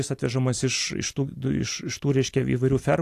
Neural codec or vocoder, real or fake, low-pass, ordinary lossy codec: none; real; 14.4 kHz; AAC, 64 kbps